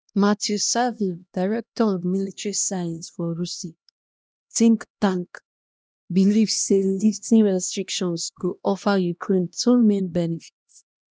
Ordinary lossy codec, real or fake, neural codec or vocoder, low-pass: none; fake; codec, 16 kHz, 1 kbps, X-Codec, HuBERT features, trained on LibriSpeech; none